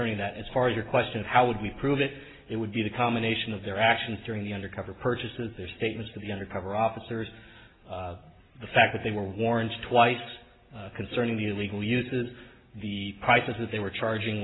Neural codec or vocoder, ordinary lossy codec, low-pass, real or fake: none; AAC, 16 kbps; 7.2 kHz; real